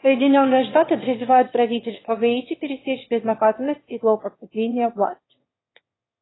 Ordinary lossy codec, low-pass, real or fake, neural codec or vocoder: AAC, 16 kbps; 7.2 kHz; fake; autoencoder, 22.05 kHz, a latent of 192 numbers a frame, VITS, trained on one speaker